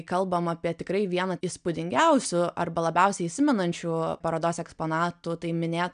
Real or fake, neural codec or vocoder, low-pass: real; none; 9.9 kHz